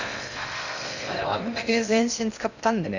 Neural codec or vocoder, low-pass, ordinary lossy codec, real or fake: codec, 16 kHz in and 24 kHz out, 0.6 kbps, FocalCodec, streaming, 2048 codes; 7.2 kHz; none; fake